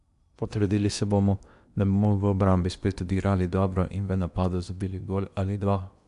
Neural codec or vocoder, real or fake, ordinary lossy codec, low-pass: codec, 16 kHz in and 24 kHz out, 0.8 kbps, FocalCodec, streaming, 65536 codes; fake; none; 10.8 kHz